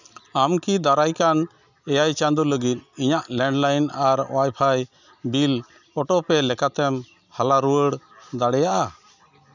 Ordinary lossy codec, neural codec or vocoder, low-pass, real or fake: none; none; 7.2 kHz; real